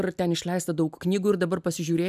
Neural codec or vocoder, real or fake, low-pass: none; real; 14.4 kHz